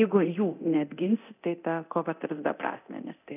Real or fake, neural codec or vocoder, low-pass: fake; codec, 24 kHz, 0.9 kbps, DualCodec; 3.6 kHz